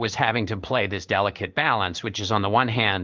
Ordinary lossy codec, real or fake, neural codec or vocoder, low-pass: Opus, 32 kbps; fake; codec, 16 kHz in and 24 kHz out, 1 kbps, XY-Tokenizer; 7.2 kHz